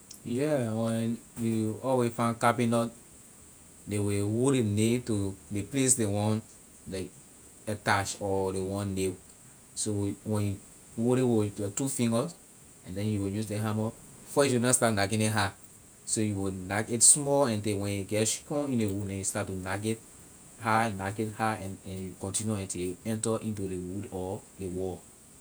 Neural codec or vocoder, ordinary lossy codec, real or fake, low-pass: vocoder, 48 kHz, 128 mel bands, Vocos; none; fake; none